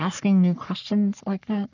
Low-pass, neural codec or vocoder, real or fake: 7.2 kHz; codec, 44.1 kHz, 3.4 kbps, Pupu-Codec; fake